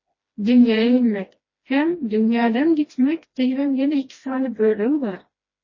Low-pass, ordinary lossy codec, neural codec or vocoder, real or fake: 7.2 kHz; MP3, 32 kbps; codec, 16 kHz, 1 kbps, FreqCodec, smaller model; fake